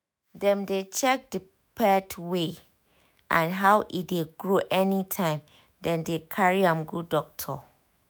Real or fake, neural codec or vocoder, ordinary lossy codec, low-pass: fake; autoencoder, 48 kHz, 128 numbers a frame, DAC-VAE, trained on Japanese speech; none; none